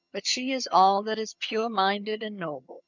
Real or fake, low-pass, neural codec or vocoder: fake; 7.2 kHz; vocoder, 22.05 kHz, 80 mel bands, HiFi-GAN